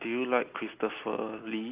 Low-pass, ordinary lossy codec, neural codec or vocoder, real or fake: 3.6 kHz; Opus, 32 kbps; none; real